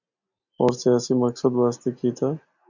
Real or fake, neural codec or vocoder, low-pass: real; none; 7.2 kHz